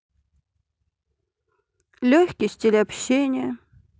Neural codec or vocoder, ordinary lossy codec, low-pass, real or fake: none; none; none; real